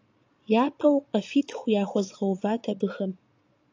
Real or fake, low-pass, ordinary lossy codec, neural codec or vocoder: fake; 7.2 kHz; AAC, 48 kbps; vocoder, 22.05 kHz, 80 mel bands, Vocos